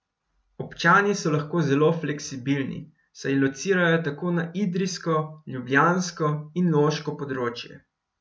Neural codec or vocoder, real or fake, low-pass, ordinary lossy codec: none; real; none; none